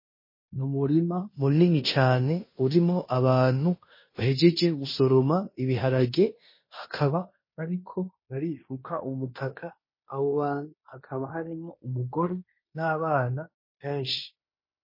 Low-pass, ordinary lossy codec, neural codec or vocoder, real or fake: 5.4 kHz; MP3, 24 kbps; codec, 16 kHz in and 24 kHz out, 0.9 kbps, LongCat-Audio-Codec, fine tuned four codebook decoder; fake